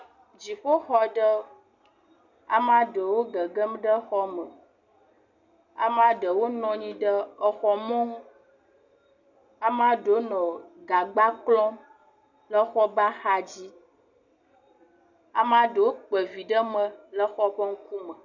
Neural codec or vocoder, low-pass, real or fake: none; 7.2 kHz; real